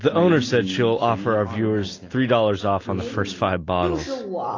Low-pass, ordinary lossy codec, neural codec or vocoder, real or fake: 7.2 kHz; AAC, 32 kbps; none; real